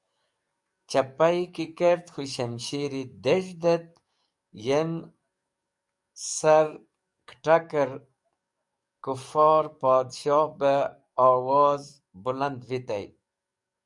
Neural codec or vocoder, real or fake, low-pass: codec, 44.1 kHz, 7.8 kbps, DAC; fake; 10.8 kHz